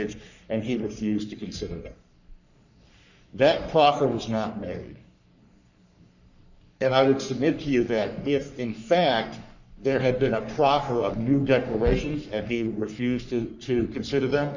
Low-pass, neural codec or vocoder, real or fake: 7.2 kHz; codec, 44.1 kHz, 3.4 kbps, Pupu-Codec; fake